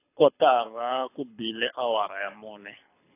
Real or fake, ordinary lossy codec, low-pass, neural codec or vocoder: fake; AAC, 24 kbps; 3.6 kHz; codec, 24 kHz, 6 kbps, HILCodec